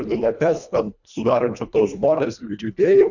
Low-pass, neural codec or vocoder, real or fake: 7.2 kHz; codec, 24 kHz, 1.5 kbps, HILCodec; fake